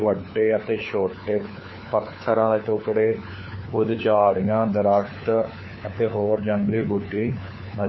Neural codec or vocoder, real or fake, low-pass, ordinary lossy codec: codec, 16 kHz, 4 kbps, FunCodec, trained on LibriTTS, 50 frames a second; fake; 7.2 kHz; MP3, 24 kbps